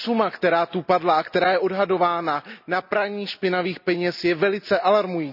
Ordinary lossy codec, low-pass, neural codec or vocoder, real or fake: none; 5.4 kHz; none; real